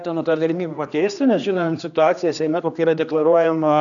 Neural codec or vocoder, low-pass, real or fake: codec, 16 kHz, 2 kbps, X-Codec, HuBERT features, trained on general audio; 7.2 kHz; fake